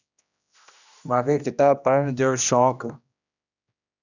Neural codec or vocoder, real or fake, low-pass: codec, 16 kHz, 1 kbps, X-Codec, HuBERT features, trained on general audio; fake; 7.2 kHz